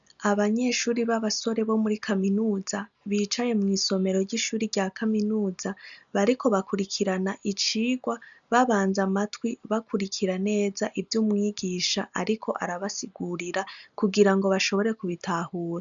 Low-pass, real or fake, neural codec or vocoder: 7.2 kHz; real; none